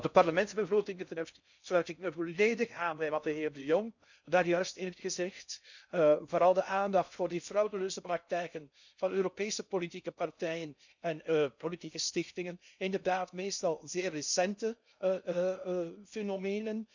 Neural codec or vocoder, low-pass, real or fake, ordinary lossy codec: codec, 16 kHz in and 24 kHz out, 0.6 kbps, FocalCodec, streaming, 2048 codes; 7.2 kHz; fake; none